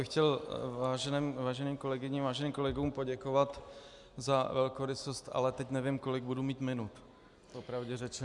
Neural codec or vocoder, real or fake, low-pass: none; real; 10.8 kHz